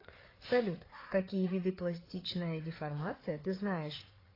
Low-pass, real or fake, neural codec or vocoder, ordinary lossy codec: 5.4 kHz; fake; codec, 16 kHz, 4 kbps, FreqCodec, larger model; AAC, 24 kbps